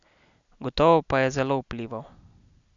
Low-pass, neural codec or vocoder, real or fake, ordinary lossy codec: 7.2 kHz; none; real; MP3, 96 kbps